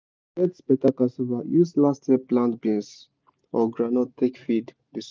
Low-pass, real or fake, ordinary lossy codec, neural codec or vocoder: none; real; none; none